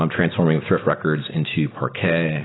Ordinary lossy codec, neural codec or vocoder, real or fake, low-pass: AAC, 16 kbps; none; real; 7.2 kHz